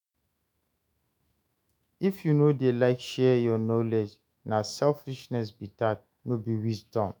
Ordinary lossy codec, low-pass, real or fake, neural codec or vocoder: none; none; fake; autoencoder, 48 kHz, 128 numbers a frame, DAC-VAE, trained on Japanese speech